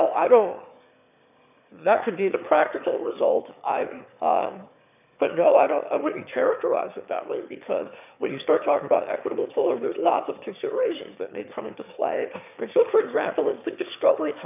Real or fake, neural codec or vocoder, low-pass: fake; autoencoder, 22.05 kHz, a latent of 192 numbers a frame, VITS, trained on one speaker; 3.6 kHz